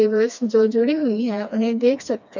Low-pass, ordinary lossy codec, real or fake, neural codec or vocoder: 7.2 kHz; none; fake; codec, 16 kHz, 2 kbps, FreqCodec, smaller model